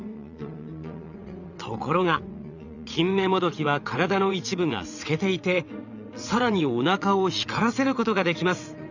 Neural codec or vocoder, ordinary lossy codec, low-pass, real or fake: vocoder, 22.05 kHz, 80 mel bands, WaveNeXt; none; 7.2 kHz; fake